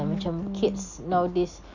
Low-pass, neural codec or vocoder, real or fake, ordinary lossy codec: 7.2 kHz; none; real; none